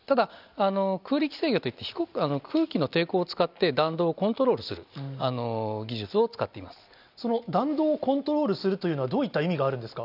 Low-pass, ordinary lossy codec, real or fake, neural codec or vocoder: 5.4 kHz; none; real; none